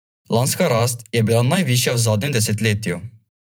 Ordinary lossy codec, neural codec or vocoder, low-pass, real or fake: none; vocoder, 44.1 kHz, 128 mel bands every 512 samples, BigVGAN v2; none; fake